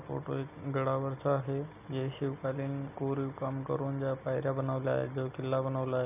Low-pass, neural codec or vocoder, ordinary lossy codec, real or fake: 3.6 kHz; none; none; real